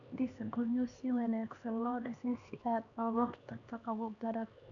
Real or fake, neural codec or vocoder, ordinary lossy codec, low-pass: fake; codec, 16 kHz, 2 kbps, X-Codec, HuBERT features, trained on LibriSpeech; none; 7.2 kHz